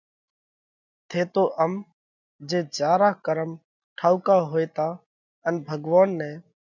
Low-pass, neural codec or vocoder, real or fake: 7.2 kHz; none; real